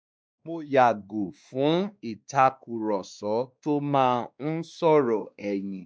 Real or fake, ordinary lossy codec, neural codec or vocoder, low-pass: fake; none; codec, 16 kHz, 2 kbps, X-Codec, WavLM features, trained on Multilingual LibriSpeech; none